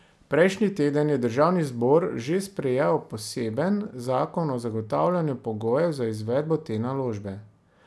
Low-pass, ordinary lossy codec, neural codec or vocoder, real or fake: none; none; none; real